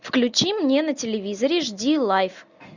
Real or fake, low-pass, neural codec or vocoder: real; 7.2 kHz; none